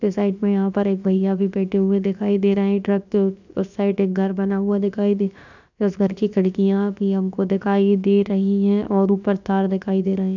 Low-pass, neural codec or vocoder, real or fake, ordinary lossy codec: 7.2 kHz; codec, 16 kHz, about 1 kbps, DyCAST, with the encoder's durations; fake; none